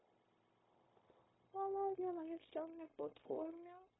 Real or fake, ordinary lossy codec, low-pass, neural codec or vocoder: fake; AAC, 16 kbps; 7.2 kHz; codec, 16 kHz, 0.9 kbps, LongCat-Audio-Codec